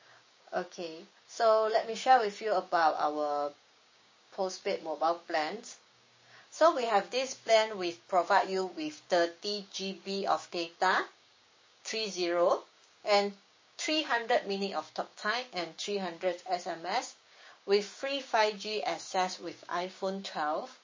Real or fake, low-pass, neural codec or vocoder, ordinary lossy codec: fake; 7.2 kHz; codec, 16 kHz, 6 kbps, DAC; MP3, 32 kbps